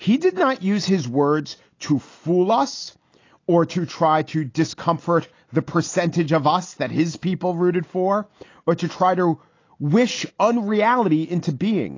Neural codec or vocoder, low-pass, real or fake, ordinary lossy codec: none; 7.2 kHz; real; AAC, 32 kbps